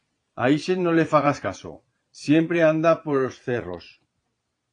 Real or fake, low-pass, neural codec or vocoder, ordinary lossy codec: fake; 9.9 kHz; vocoder, 22.05 kHz, 80 mel bands, Vocos; AAC, 48 kbps